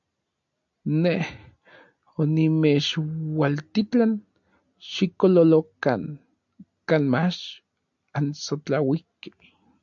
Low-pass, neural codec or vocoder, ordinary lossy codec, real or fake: 7.2 kHz; none; AAC, 64 kbps; real